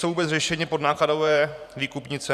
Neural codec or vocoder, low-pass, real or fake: vocoder, 44.1 kHz, 128 mel bands every 512 samples, BigVGAN v2; 14.4 kHz; fake